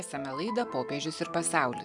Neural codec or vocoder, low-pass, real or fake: none; 10.8 kHz; real